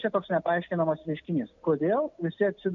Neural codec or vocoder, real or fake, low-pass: none; real; 7.2 kHz